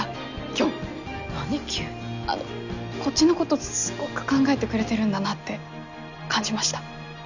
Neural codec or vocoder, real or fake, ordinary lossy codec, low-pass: none; real; none; 7.2 kHz